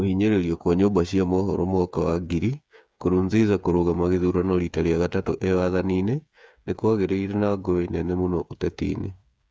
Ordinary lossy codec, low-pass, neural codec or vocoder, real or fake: none; none; codec, 16 kHz, 8 kbps, FreqCodec, smaller model; fake